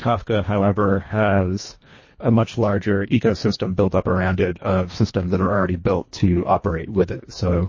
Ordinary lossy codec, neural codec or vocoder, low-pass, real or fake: MP3, 32 kbps; codec, 24 kHz, 1.5 kbps, HILCodec; 7.2 kHz; fake